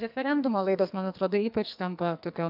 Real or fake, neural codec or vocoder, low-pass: fake; codec, 44.1 kHz, 2.6 kbps, SNAC; 5.4 kHz